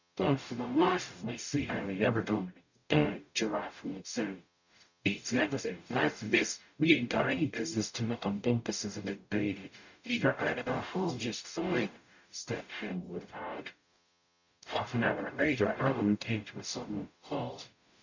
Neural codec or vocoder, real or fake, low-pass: codec, 44.1 kHz, 0.9 kbps, DAC; fake; 7.2 kHz